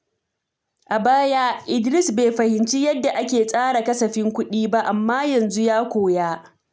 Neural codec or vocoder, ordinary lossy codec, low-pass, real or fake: none; none; none; real